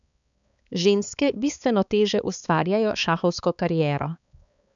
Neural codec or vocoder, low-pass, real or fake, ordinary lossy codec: codec, 16 kHz, 4 kbps, X-Codec, HuBERT features, trained on balanced general audio; 7.2 kHz; fake; none